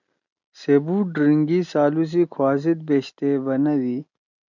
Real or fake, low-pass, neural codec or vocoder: real; 7.2 kHz; none